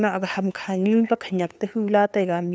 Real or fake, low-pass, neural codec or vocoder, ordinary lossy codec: fake; none; codec, 16 kHz, 2 kbps, FunCodec, trained on LibriTTS, 25 frames a second; none